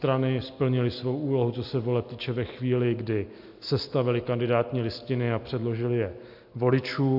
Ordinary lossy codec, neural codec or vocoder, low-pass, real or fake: MP3, 48 kbps; none; 5.4 kHz; real